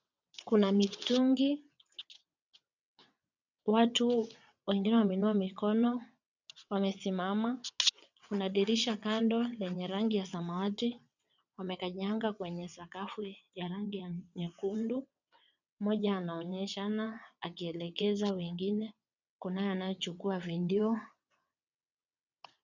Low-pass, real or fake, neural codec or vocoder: 7.2 kHz; fake; vocoder, 22.05 kHz, 80 mel bands, WaveNeXt